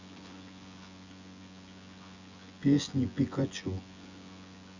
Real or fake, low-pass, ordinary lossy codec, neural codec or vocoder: fake; 7.2 kHz; Opus, 64 kbps; vocoder, 24 kHz, 100 mel bands, Vocos